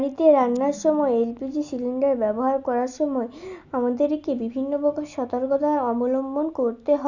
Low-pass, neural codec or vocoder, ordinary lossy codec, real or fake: 7.2 kHz; none; none; real